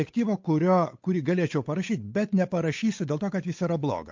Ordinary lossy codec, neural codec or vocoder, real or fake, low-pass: MP3, 48 kbps; codec, 16 kHz, 8 kbps, FunCodec, trained on Chinese and English, 25 frames a second; fake; 7.2 kHz